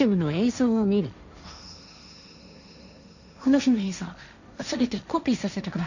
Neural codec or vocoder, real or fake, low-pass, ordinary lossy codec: codec, 16 kHz, 1.1 kbps, Voila-Tokenizer; fake; 7.2 kHz; none